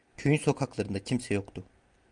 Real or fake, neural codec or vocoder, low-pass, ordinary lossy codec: real; none; 9.9 kHz; Opus, 32 kbps